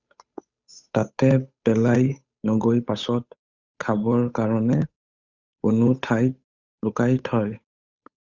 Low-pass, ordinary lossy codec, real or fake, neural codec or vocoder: 7.2 kHz; Opus, 64 kbps; fake; codec, 16 kHz, 8 kbps, FunCodec, trained on Chinese and English, 25 frames a second